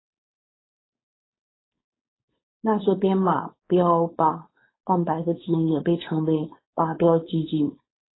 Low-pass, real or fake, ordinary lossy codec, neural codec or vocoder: 7.2 kHz; fake; AAC, 16 kbps; codec, 16 kHz, 4.8 kbps, FACodec